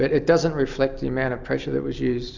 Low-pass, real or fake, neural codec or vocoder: 7.2 kHz; real; none